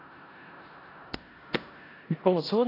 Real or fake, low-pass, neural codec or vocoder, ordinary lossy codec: fake; 5.4 kHz; codec, 16 kHz in and 24 kHz out, 0.4 kbps, LongCat-Audio-Codec, four codebook decoder; AAC, 24 kbps